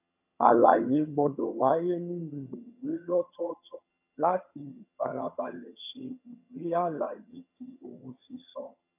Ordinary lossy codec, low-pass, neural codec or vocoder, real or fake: none; 3.6 kHz; vocoder, 22.05 kHz, 80 mel bands, HiFi-GAN; fake